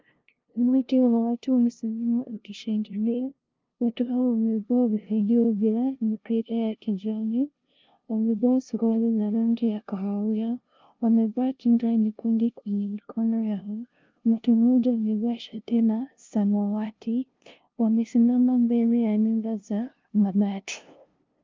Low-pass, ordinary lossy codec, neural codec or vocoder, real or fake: 7.2 kHz; Opus, 24 kbps; codec, 16 kHz, 0.5 kbps, FunCodec, trained on LibriTTS, 25 frames a second; fake